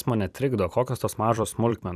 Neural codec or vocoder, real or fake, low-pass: vocoder, 44.1 kHz, 128 mel bands every 256 samples, BigVGAN v2; fake; 14.4 kHz